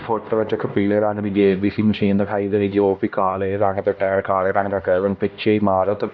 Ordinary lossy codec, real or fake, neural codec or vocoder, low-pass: none; fake; codec, 16 kHz, 1 kbps, X-Codec, HuBERT features, trained on LibriSpeech; none